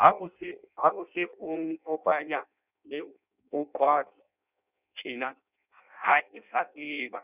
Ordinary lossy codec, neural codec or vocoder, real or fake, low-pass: none; codec, 16 kHz in and 24 kHz out, 0.6 kbps, FireRedTTS-2 codec; fake; 3.6 kHz